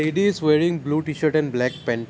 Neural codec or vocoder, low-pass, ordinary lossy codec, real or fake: none; none; none; real